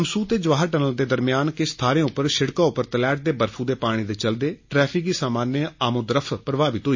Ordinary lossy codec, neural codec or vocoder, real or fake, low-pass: MP3, 32 kbps; none; real; 7.2 kHz